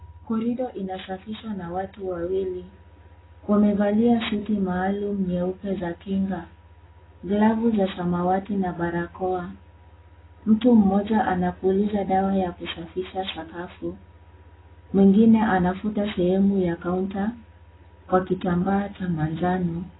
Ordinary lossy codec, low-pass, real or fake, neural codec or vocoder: AAC, 16 kbps; 7.2 kHz; real; none